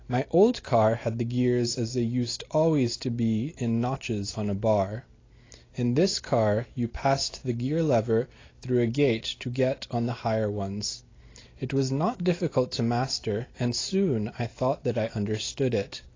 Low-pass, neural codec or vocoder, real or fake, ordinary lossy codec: 7.2 kHz; none; real; AAC, 32 kbps